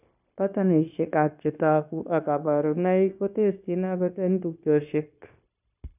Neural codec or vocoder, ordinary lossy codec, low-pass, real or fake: codec, 16 kHz, 0.9 kbps, LongCat-Audio-Codec; none; 3.6 kHz; fake